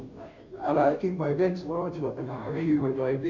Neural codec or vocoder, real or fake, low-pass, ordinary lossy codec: codec, 16 kHz, 0.5 kbps, FunCodec, trained on Chinese and English, 25 frames a second; fake; 7.2 kHz; none